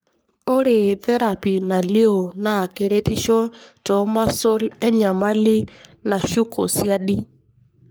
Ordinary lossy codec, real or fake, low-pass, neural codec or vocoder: none; fake; none; codec, 44.1 kHz, 3.4 kbps, Pupu-Codec